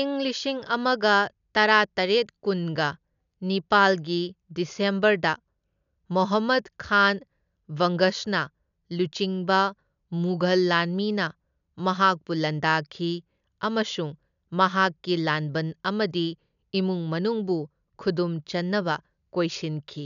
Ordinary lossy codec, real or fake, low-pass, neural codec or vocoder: none; real; 7.2 kHz; none